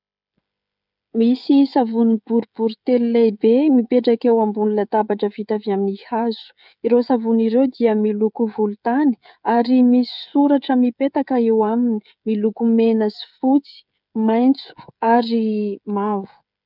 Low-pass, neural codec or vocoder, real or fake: 5.4 kHz; codec, 16 kHz, 16 kbps, FreqCodec, smaller model; fake